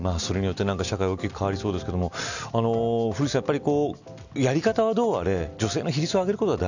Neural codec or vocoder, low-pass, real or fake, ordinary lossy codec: none; 7.2 kHz; real; none